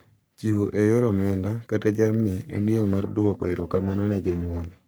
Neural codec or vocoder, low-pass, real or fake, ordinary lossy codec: codec, 44.1 kHz, 3.4 kbps, Pupu-Codec; none; fake; none